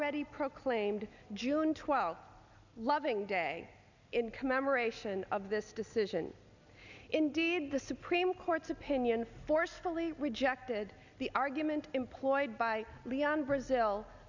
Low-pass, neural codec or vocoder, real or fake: 7.2 kHz; none; real